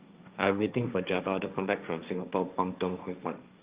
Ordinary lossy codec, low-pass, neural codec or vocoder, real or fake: Opus, 24 kbps; 3.6 kHz; codec, 16 kHz, 1.1 kbps, Voila-Tokenizer; fake